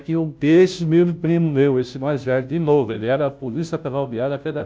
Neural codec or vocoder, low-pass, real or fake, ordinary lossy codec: codec, 16 kHz, 0.5 kbps, FunCodec, trained on Chinese and English, 25 frames a second; none; fake; none